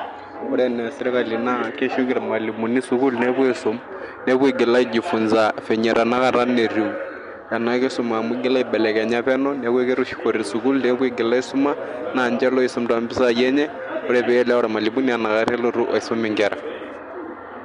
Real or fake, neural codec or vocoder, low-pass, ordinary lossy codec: real; none; 10.8 kHz; MP3, 64 kbps